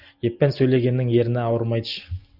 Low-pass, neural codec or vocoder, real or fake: 5.4 kHz; none; real